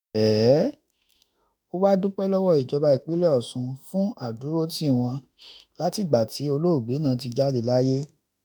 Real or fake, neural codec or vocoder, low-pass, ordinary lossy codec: fake; autoencoder, 48 kHz, 32 numbers a frame, DAC-VAE, trained on Japanese speech; none; none